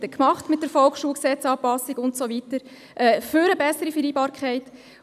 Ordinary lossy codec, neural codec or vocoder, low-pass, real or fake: none; none; 14.4 kHz; real